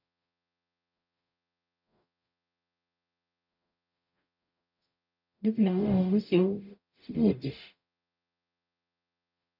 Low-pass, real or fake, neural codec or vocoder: 5.4 kHz; fake; codec, 44.1 kHz, 0.9 kbps, DAC